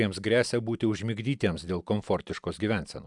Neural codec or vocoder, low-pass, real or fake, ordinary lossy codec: none; 10.8 kHz; real; MP3, 96 kbps